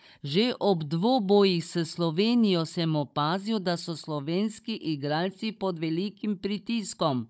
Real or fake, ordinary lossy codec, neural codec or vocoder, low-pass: fake; none; codec, 16 kHz, 16 kbps, FunCodec, trained on Chinese and English, 50 frames a second; none